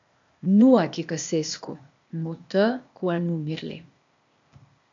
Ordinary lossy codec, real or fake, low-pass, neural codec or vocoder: MP3, 64 kbps; fake; 7.2 kHz; codec, 16 kHz, 0.8 kbps, ZipCodec